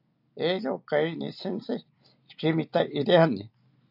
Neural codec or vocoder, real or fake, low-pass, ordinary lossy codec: none; real; 5.4 kHz; AAC, 48 kbps